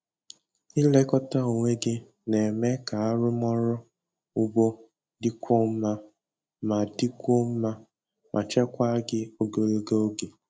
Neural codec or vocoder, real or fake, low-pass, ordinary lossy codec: none; real; none; none